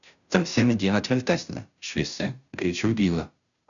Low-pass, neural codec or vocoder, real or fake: 7.2 kHz; codec, 16 kHz, 0.5 kbps, FunCodec, trained on Chinese and English, 25 frames a second; fake